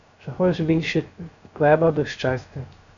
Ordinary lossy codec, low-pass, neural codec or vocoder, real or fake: MP3, 96 kbps; 7.2 kHz; codec, 16 kHz, 0.3 kbps, FocalCodec; fake